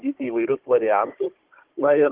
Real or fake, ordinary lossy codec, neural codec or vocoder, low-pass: fake; Opus, 16 kbps; codec, 16 kHz, 16 kbps, FunCodec, trained on Chinese and English, 50 frames a second; 3.6 kHz